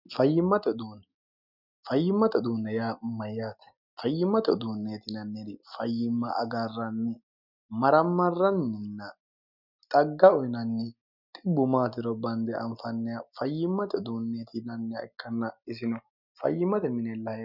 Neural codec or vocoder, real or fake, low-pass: none; real; 5.4 kHz